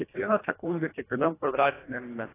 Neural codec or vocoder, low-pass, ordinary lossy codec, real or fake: codec, 24 kHz, 1.5 kbps, HILCodec; 3.6 kHz; AAC, 16 kbps; fake